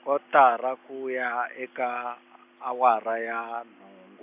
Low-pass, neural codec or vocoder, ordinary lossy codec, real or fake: 3.6 kHz; none; AAC, 32 kbps; real